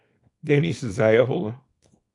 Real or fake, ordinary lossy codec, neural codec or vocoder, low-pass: fake; AAC, 48 kbps; codec, 24 kHz, 0.9 kbps, WavTokenizer, small release; 10.8 kHz